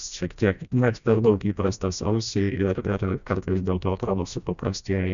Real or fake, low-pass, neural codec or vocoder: fake; 7.2 kHz; codec, 16 kHz, 1 kbps, FreqCodec, smaller model